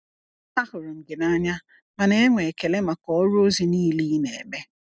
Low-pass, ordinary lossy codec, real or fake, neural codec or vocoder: none; none; real; none